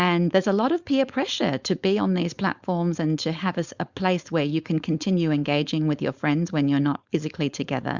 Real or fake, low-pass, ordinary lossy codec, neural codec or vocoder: fake; 7.2 kHz; Opus, 64 kbps; codec, 16 kHz, 4.8 kbps, FACodec